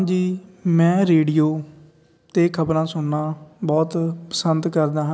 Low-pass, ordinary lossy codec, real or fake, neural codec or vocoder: none; none; real; none